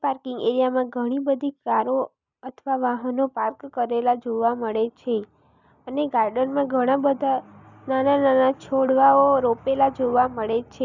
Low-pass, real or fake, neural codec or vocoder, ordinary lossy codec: 7.2 kHz; real; none; none